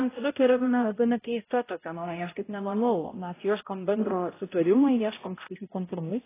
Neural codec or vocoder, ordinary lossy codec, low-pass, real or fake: codec, 16 kHz, 0.5 kbps, X-Codec, HuBERT features, trained on balanced general audio; AAC, 16 kbps; 3.6 kHz; fake